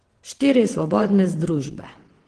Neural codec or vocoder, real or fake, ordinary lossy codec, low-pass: vocoder, 22.05 kHz, 80 mel bands, WaveNeXt; fake; Opus, 16 kbps; 9.9 kHz